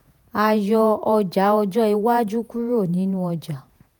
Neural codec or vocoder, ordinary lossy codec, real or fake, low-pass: vocoder, 48 kHz, 128 mel bands, Vocos; none; fake; none